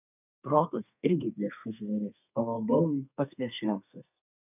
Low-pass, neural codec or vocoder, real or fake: 3.6 kHz; codec, 32 kHz, 1.9 kbps, SNAC; fake